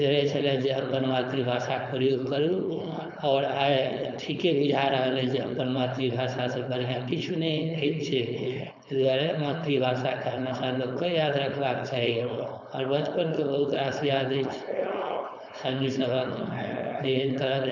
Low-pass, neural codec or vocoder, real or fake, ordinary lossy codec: 7.2 kHz; codec, 16 kHz, 4.8 kbps, FACodec; fake; none